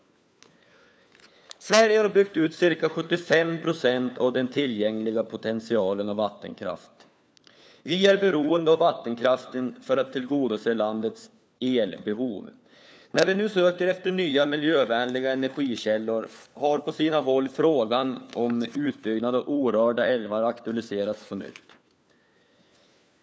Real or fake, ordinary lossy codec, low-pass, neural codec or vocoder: fake; none; none; codec, 16 kHz, 4 kbps, FunCodec, trained on LibriTTS, 50 frames a second